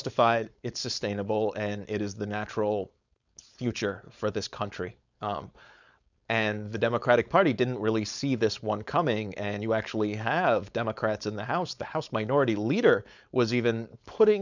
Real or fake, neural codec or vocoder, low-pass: fake; codec, 16 kHz, 4.8 kbps, FACodec; 7.2 kHz